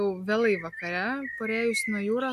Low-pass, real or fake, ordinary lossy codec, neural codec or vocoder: 14.4 kHz; real; AAC, 96 kbps; none